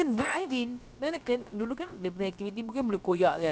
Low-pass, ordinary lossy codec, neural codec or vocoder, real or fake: none; none; codec, 16 kHz, about 1 kbps, DyCAST, with the encoder's durations; fake